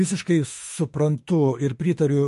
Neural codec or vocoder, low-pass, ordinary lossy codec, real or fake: autoencoder, 48 kHz, 32 numbers a frame, DAC-VAE, trained on Japanese speech; 14.4 kHz; MP3, 48 kbps; fake